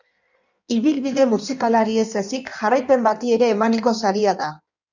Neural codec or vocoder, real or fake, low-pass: codec, 16 kHz in and 24 kHz out, 1.1 kbps, FireRedTTS-2 codec; fake; 7.2 kHz